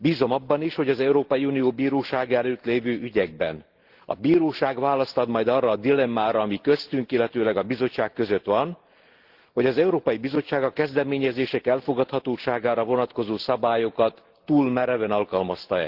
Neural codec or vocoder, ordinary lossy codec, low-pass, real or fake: none; Opus, 16 kbps; 5.4 kHz; real